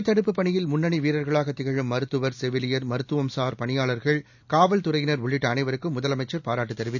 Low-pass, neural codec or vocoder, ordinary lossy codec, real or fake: 7.2 kHz; none; none; real